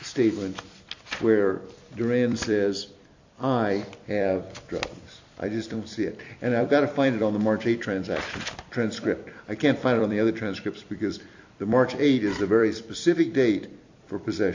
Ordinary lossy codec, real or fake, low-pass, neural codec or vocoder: AAC, 48 kbps; fake; 7.2 kHz; vocoder, 44.1 kHz, 128 mel bands every 256 samples, BigVGAN v2